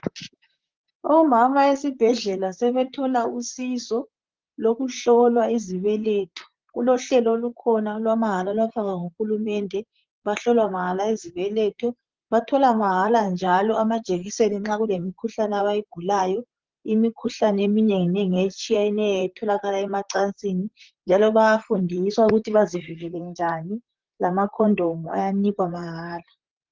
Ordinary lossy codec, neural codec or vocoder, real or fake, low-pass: Opus, 32 kbps; vocoder, 44.1 kHz, 128 mel bands, Pupu-Vocoder; fake; 7.2 kHz